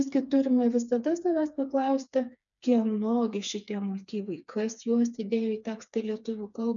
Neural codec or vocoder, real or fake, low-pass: codec, 16 kHz, 4 kbps, FreqCodec, smaller model; fake; 7.2 kHz